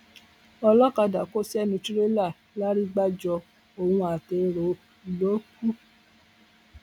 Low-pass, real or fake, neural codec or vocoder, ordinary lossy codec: 19.8 kHz; real; none; none